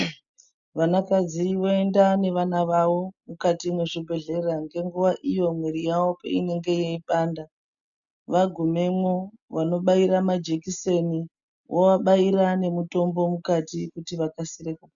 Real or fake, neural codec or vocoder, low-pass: real; none; 7.2 kHz